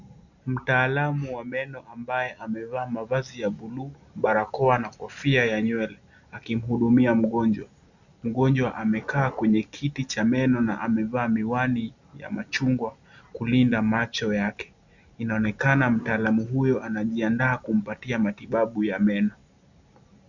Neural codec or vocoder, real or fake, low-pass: none; real; 7.2 kHz